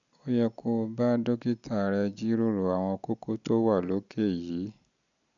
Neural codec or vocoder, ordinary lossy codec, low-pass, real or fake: none; none; 7.2 kHz; real